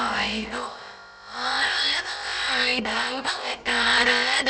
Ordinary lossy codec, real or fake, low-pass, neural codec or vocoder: none; fake; none; codec, 16 kHz, about 1 kbps, DyCAST, with the encoder's durations